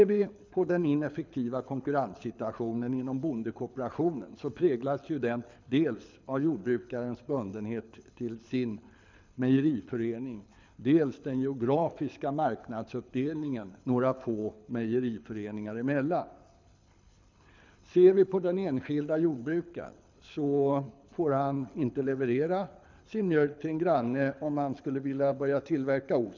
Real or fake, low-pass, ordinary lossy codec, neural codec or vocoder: fake; 7.2 kHz; MP3, 64 kbps; codec, 24 kHz, 6 kbps, HILCodec